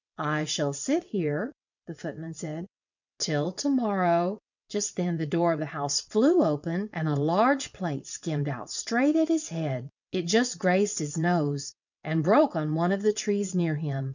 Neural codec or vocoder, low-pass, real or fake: vocoder, 22.05 kHz, 80 mel bands, WaveNeXt; 7.2 kHz; fake